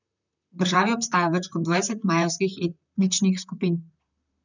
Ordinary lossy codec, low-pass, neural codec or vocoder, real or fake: none; 7.2 kHz; vocoder, 44.1 kHz, 128 mel bands, Pupu-Vocoder; fake